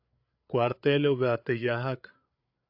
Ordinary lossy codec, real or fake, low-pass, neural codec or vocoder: AAC, 48 kbps; fake; 5.4 kHz; codec, 16 kHz, 8 kbps, FreqCodec, larger model